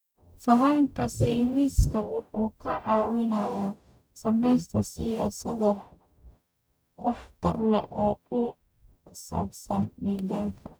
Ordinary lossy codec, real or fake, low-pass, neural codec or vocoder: none; fake; none; codec, 44.1 kHz, 0.9 kbps, DAC